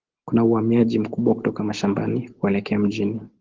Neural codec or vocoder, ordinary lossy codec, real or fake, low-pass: none; Opus, 16 kbps; real; 7.2 kHz